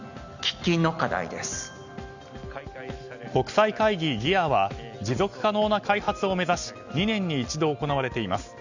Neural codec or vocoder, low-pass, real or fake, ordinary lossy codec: none; 7.2 kHz; real; Opus, 64 kbps